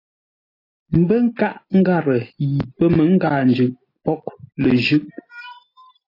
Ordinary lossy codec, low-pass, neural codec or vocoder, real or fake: AAC, 24 kbps; 5.4 kHz; none; real